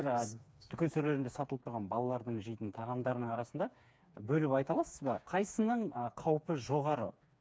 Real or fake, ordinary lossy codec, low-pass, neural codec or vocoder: fake; none; none; codec, 16 kHz, 4 kbps, FreqCodec, smaller model